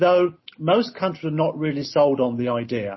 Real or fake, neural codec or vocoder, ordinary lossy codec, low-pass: real; none; MP3, 24 kbps; 7.2 kHz